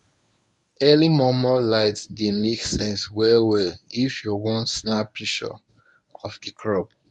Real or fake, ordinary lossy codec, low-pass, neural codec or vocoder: fake; none; 10.8 kHz; codec, 24 kHz, 0.9 kbps, WavTokenizer, medium speech release version 1